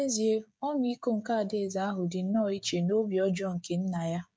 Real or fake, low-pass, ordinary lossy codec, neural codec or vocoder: fake; none; none; codec, 16 kHz, 16 kbps, FreqCodec, smaller model